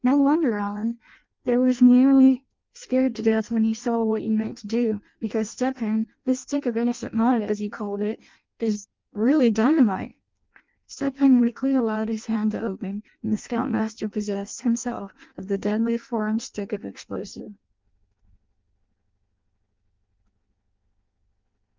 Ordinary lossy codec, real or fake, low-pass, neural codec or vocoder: Opus, 24 kbps; fake; 7.2 kHz; codec, 16 kHz in and 24 kHz out, 0.6 kbps, FireRedTTS-2 codec